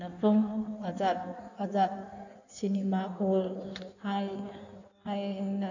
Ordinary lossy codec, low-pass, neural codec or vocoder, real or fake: none; 7.2 kHz; codec, 16 kHz in and 24 kHz out, 1.1 kbps, FireRedTTS-2 codec; fake